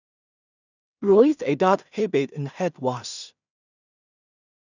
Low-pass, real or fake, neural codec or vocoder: 7.2 kHz; fake; codec, 16 kHz in and 24 kHz out, 0.4 kbps, LongCat-Audio-Codec, two codebook decoder